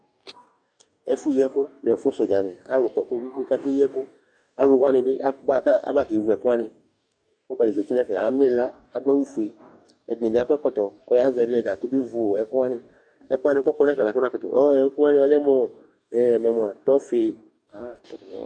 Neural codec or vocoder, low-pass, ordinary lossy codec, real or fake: codec, 44.1 kHz, 2.6 kbps, DAC; 9.9 kHz; MP3, 96 kbps; fake